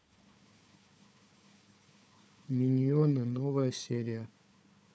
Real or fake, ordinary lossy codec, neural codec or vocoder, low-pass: fake; none; codec, 16 kHz, 4 kbps, FunCodec, trained on Chinese and English, 50 frames a second; none